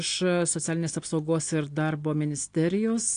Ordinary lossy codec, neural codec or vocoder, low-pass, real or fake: AAC, 48 kbps; none; 9.9 kHz; real